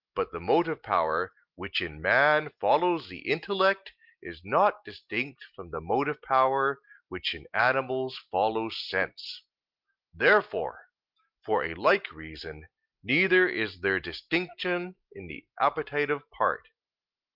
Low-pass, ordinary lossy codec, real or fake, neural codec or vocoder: 5.4 kHz; Opus, 32 kbps; real; none